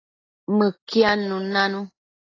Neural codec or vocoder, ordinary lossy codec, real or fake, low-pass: none; AAC, 32 kbps; real; 7.2 kHz